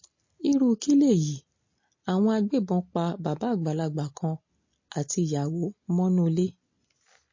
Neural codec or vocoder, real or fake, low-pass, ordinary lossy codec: none; real; 7.2 kHz; MP3, 32 kbps